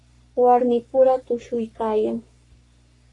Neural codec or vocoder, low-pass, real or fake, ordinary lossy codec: codec, 44.1 kHz, 3.4 kbps, Pupu-Codec; 10.8 kHz; fake; AAC, 48 kbps